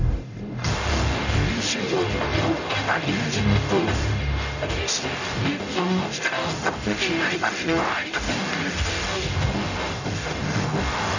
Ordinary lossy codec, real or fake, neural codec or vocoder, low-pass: none; fake; codec, 44.1 kHz, 0.9 kbps, DAC; 7.2 kHz